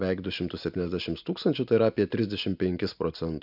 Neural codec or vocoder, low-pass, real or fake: none; 5.4 kHz; real